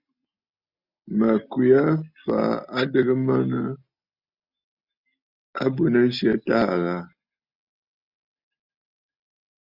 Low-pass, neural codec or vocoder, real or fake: 5.4 kHz; none; real